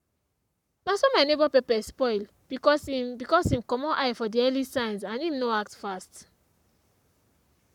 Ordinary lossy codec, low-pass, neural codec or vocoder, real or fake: none; 19.8 kHz; codec, 44.1 kHz, 7.8 kbps, Pupu-Codec; fake